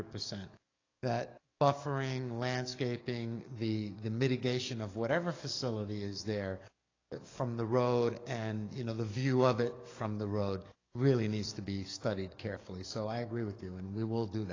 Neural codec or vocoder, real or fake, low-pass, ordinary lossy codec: codec, 44.1 kHz, 7.8 kbps, DAC; fake; 7.2 kHz; AAC, 32 kbps